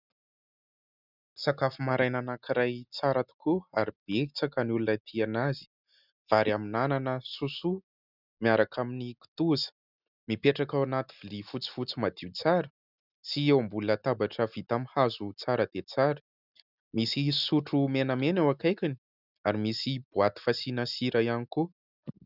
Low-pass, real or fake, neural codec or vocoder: 5.4 kHz; real; none